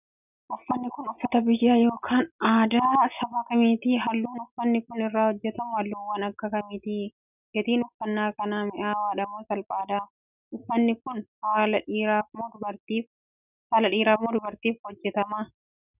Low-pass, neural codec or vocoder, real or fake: 3.6 kHz; none; real